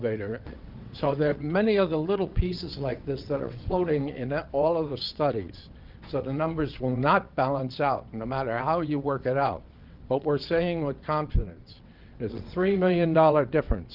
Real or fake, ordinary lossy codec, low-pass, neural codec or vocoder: fake; Opus, 24 kbps; 5.4 kHz; vocoder, 22.05 kHz, 80 mel bands, WaveNeXt